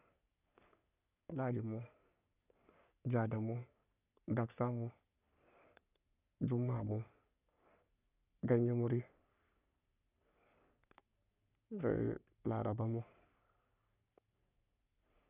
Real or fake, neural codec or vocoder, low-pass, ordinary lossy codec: fake; codec, 44.1 kHz, 3.4 kbps, Pupu-Codec; 3.6 kHz; none